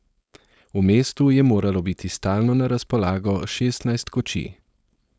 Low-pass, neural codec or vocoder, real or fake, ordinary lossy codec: none; codec, 16 kHz, 4.8 kbps, FACodec; fake; none